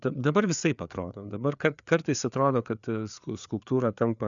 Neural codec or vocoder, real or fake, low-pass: codec, 16 kHz, 4 kbps, FreqCodec, larger model; fake; 7.2 kHz